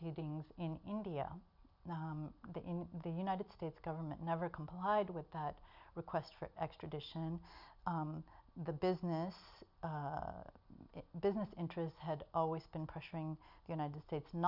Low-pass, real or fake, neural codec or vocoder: 5.4 kHz; real; none